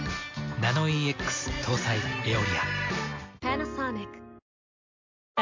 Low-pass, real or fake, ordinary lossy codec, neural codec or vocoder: 7.2 kHz; real; MP3, 48 kbps; none